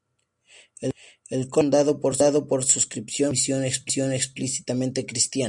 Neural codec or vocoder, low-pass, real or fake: none; 10.8 kHz; real